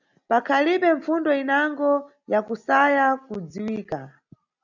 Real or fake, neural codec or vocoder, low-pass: real; none; 7.2 kHz